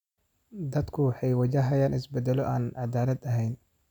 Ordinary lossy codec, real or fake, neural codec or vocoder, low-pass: none; real; none; 19.8 kHz